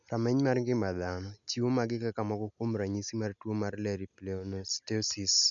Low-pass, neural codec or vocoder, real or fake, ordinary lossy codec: 7.2 kHz; none; real; none